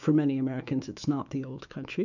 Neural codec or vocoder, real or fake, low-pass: autoencoder, 48 kHz, 128 numbers a frame, DAC-VAE, trained on Japanese speech; fake; 7.2 kHz